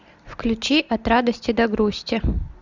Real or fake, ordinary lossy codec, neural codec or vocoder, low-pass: real; Opus, 64 kbps; none; 7.2 kHz